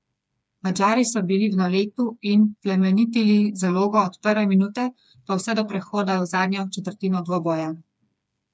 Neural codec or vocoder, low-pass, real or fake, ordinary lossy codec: codec, 16 kHz, 4 kbps, FreqCodec, smaller model; none; fake; none